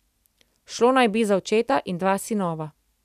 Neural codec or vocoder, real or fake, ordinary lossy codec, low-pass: none; real; none; 14.4 kHz